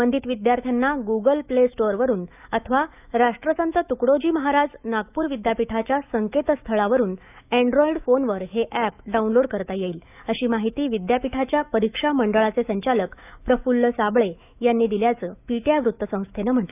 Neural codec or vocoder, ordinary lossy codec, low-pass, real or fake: autoencoder, 48 kHz, 128 numbers a frame, DAC-VAE, trained on Japanese speech; none; 3.6 kHz; fake